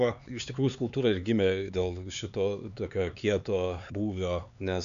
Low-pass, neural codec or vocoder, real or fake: 7.2 kHz; codec, 16 kHz, 4 kbps, X-Codec, HuBERT features, trained on LibriSpeech; fake